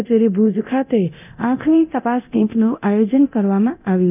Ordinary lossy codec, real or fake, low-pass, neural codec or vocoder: none; fake; 3.6 kHz; codec, 24 kHz, 0.9 kbps, DualCodec